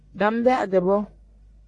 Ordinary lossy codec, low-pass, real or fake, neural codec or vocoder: AAC, 48 kbps; 10.8 kHz; fake; codec, 44.1 kHz, 1.7 kbps, Pupu-Codec